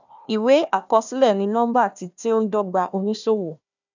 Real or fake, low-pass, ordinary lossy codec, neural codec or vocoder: fake; 7.2 kHz; none; codec, 16 kHz, 1 kbps, FunCodec, trained on Chinese and English, 50 frames a second